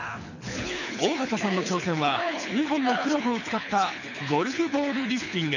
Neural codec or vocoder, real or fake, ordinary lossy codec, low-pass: codec, 24 kHz, 6 kbps, HILCodec; fake; none; 7.2 kHz